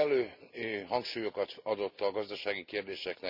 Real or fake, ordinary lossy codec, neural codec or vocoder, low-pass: real; none; none; 5.4 kHz